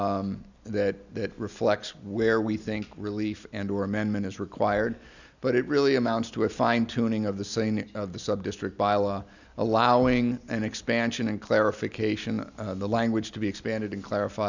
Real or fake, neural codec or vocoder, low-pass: real; none; 7.2 kHz